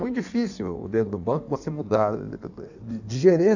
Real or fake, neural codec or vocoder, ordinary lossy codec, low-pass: fake; codec, 16 kHz in and 24 kHz out, 1.1 kbps, FireRedTTS-2 codec; none; 7.2 kHz